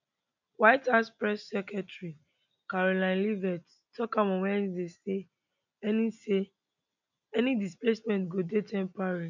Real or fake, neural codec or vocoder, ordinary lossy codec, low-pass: real; none; none; 7.2 kHz